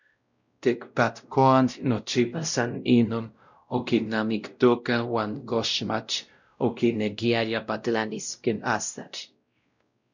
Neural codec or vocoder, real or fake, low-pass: codec, 16 kHz, 0.5 kbps, X-Codec, WavLM features, trained on Multilingual LibriSpeech; fake; 7.2 kHz